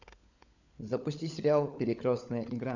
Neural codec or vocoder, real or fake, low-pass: codec, 16 kHz, 16 kbps, FunCodec, trained on LibriTTS, 50 frames a second; fake; 7.2 kHz